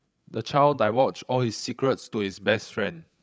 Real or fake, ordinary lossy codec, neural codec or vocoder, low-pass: fake; none; codec, 16 kHz, 8 kbps, FreqCodec, larger model; none